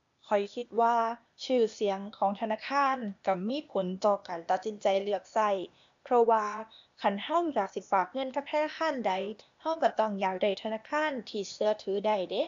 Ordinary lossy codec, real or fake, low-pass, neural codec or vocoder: AAC, 64 kbps; fake; 7.2 kHz; codec, 16 kHz, 0.8 kbps, ZipCodec